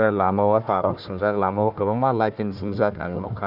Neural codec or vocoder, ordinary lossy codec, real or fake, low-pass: codec, 16 kHz, 1 kbps, FunCodec, trained on Chinese and English, 50 frames a second; none; fake; 5.4 kHz